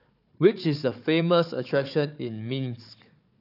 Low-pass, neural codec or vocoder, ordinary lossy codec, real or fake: 5.4 kHz; codec, 16 kHz, 4 kbps, FunCodec, trained on Chinese and English, 50 frames a second; none; fake